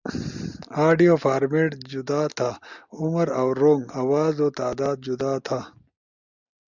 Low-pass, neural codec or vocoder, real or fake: 7.2 kHz; none; real